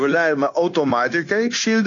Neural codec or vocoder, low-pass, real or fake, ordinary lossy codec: codec, 16 kHz, 0.9 kbps, LongCat-Audio-Codec; 7.2 kHz; fake; AAC, 48 kbps